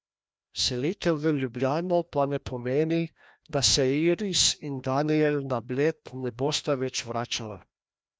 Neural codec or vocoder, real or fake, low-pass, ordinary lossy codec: codec, 16 kHz, 1 kbps, FreqCodec, larger model; fake; none; none